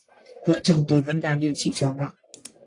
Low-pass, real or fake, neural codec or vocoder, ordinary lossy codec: 10.8 kHz; fake; codec, 44.1 kHz, 1.7 kbps, Pupu-Codec; AAC, 48 kbps